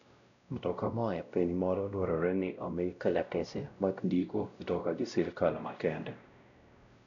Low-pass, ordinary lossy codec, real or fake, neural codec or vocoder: 7.2 kHz; none; fake; codec, 16 kHz, 0.5 kbps, X-Codec, WavLM features, trained on Multilingual LibriSpeech